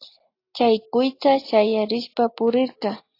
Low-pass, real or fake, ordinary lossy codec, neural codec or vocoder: 5.4 kHz; real; AAC, 32 kbps; none